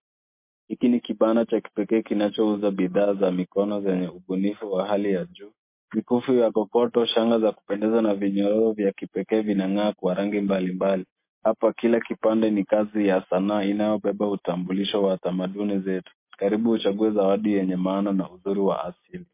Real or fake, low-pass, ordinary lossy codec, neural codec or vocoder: real; 3.6 kHz; MP3, 24 kbps; none